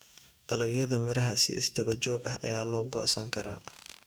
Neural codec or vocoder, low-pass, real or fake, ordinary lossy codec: codec, 44.1 kHz, 2.6 kbps, DAC; none; fake; none